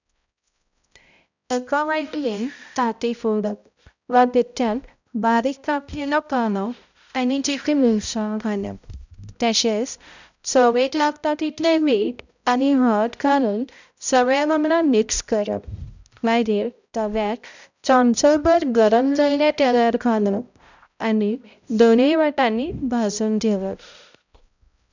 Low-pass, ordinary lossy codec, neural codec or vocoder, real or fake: 7.2 kHz; none; codec, 16 kHz, 0.5 kbps, X-Codec, HuBERT features, trained on balanced general audio; fake